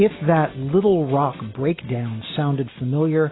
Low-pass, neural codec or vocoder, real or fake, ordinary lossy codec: 7.2 kHz; none; real; AAC, 16 kbps